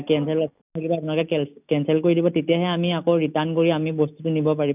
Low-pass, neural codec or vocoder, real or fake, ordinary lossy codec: 3.6 kHz; none; real; none